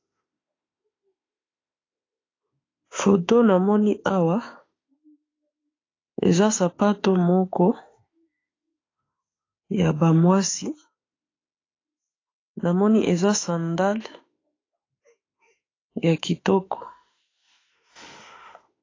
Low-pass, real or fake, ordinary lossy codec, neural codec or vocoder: 7.2 kHz; fake; AAC, 32 kbps; autoencoder, 48 kHz, 32 numbers a frame, DAC-VAE, trained on Japanese speech